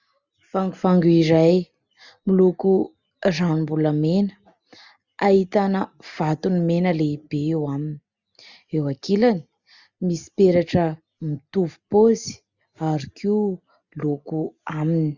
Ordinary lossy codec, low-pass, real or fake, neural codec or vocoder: Opus, 64 kbps; 7.2 kHz; real; none